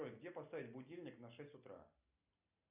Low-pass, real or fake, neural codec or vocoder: 3.6 kHz; real; none